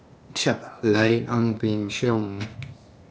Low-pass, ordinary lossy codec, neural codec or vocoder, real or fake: none; none; codec, 16 kHz, 0.8 kbps, ZipCodec; fake